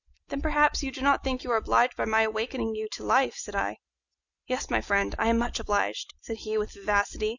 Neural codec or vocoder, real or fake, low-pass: none; real; 7.2 kHz